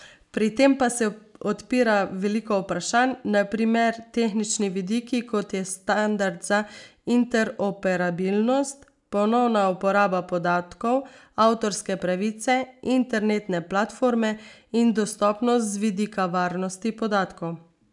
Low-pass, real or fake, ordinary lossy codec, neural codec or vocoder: 10.8 kHz; real; none; none